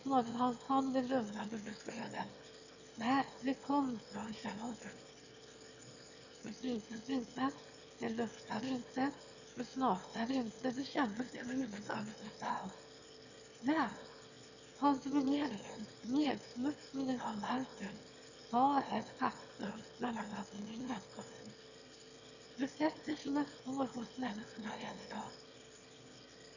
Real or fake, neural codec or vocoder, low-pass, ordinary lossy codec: fake; autoencoder, 22.05 kHz, a latent of 192 numbers a frame, VITS, trained on one speaker; 7.2 kHz; none